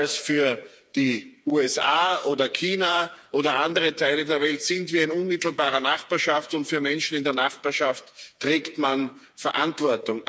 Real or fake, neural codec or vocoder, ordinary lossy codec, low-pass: fake; codec, 16 kHz, 4 kbps, FreqCodec, smaller model; none; none